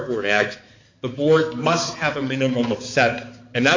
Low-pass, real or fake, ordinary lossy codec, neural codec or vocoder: 7.2 kHz; fake; MP3, 64 kbps; codec, 16 kHz, 4 kbps, X-Codec, HuBERT features, trained on balanced general audio